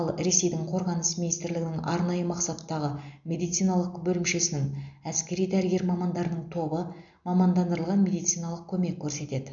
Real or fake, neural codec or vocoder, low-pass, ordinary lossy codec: real; none; 7.2 kHz; none